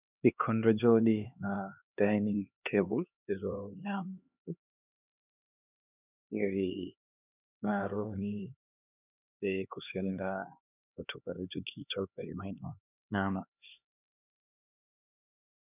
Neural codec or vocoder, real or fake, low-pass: codec, 16 kHz, 2 kbps, X-Codec, HuBERT features, trained on LibriSpeech; fake; 3.6 kHz